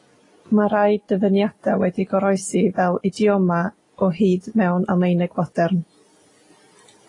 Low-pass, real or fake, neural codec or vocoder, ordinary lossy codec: 10.8 kHz; real; none; AAC, 32 kbps